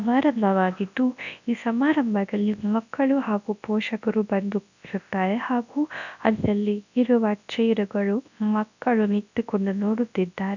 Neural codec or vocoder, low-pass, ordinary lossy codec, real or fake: codec, 24 kHz, 0.9 kbps, WavTokenizer, large speech release; 7.2 kHz; none; fake